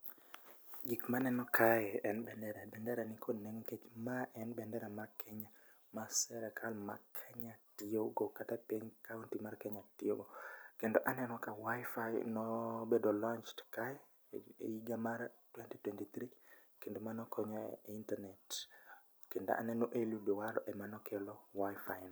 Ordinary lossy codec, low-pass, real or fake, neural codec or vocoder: none; none; real; none